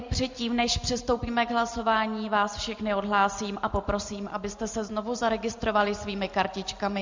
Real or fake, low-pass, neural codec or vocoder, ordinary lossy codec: real; 7.2 kHz; none; MP3, 64 kbps